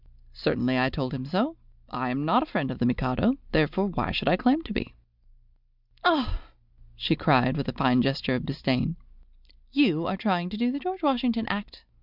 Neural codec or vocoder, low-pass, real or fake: none; 5.4 kHz; real